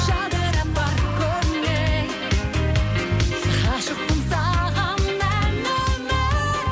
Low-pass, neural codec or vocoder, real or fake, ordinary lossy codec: none; none; real; none